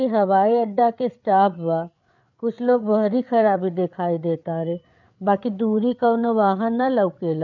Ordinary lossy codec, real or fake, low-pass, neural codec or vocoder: none; fake; 7.2 kHz; codec, 16 kHz, 8 kbps, FreqCodec, larger model